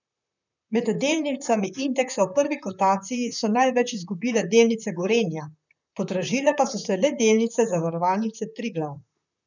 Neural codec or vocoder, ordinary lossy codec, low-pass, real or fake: vocoder, 44.1 kHz, 128 mel bands, Pupu-Vocoder; none; 7.2 kHz; fake